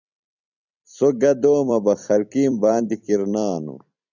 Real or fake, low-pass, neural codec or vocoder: fake; 7.2 kHz; vocoder, 44.1 kHz, 128 mel bands every 256 samples, BigVGAN v2